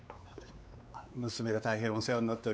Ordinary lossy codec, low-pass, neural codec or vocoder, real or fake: none; none; codec, 16 kHz, 4 kbps, X-Codec, WavLM features, trained on Multilingual LibriSpeech; fake